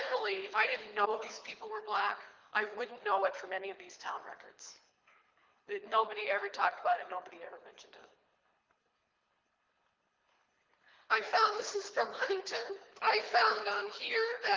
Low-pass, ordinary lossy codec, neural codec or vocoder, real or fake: 7.2 kHz; Opus, 24 kbps; codec, 24 kHz, 3 kbps, HILCodec; fake